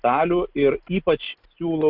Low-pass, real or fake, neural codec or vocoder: 5.4 kHz; real; none